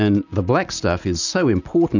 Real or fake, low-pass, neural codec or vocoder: real; 7.2 kHz; none